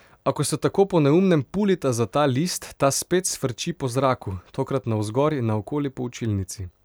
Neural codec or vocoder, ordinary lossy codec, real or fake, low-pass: vocoder, 44.1 kHz, 128 mel bands every 256 samples, BigVGAN v2; none; fake; none